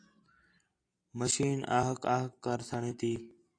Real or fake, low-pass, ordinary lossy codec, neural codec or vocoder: real; 9.9 kHz; AAC, 48 kbps; none